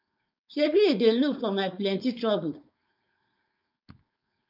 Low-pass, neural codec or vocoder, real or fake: 5.4 kHz; codec, 16 kHz, 4.8 kbps, FACodec; fake